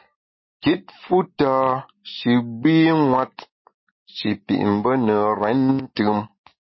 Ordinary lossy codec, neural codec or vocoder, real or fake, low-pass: MP3, 24 kbps; none; real; 7.2 kHz